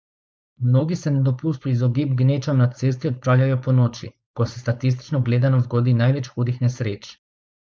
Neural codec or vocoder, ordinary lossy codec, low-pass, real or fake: codec, 16 kHz, 4.8 kbps, FACodec; none; none; fake